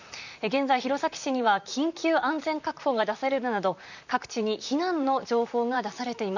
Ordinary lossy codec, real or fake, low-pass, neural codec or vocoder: none; fake; 7.2 kHz; vocoder, 44.1 kHz, 128 mel bands, Pupu-Vocoder